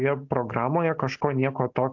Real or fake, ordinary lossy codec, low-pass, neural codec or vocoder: real; AAC, 48 kbps; 7.2 kHz; none